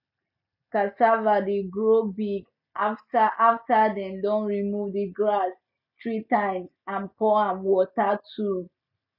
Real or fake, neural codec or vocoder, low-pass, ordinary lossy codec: real; none; 5.4 kHz; none